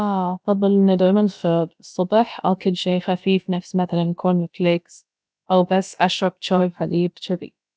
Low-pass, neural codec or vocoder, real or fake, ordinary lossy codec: none; codec, 16 kHz, about 1 kbps, DyCAST, with the encoder's durations; fake; none